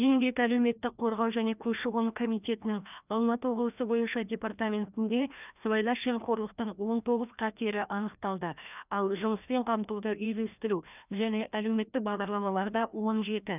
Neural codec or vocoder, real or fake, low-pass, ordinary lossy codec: codec, 16 kHz, 1 kbps, FreqCodec, larger model; fake; 3.6 kHz; none